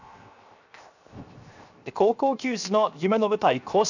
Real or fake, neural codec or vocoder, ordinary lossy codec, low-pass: fake; codec, 16 kHz, 0.7 kbps, FocalCodec; none; 7.2 kHz